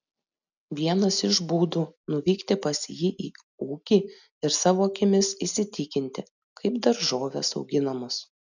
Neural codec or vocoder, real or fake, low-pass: none; real; 7.2 kHz